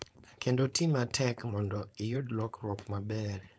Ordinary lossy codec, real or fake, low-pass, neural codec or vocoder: none; fake; none; codec, 16 kHz, 4.8 kbps, FACodec